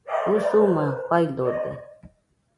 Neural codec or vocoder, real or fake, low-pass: none; real; 10.8 kHz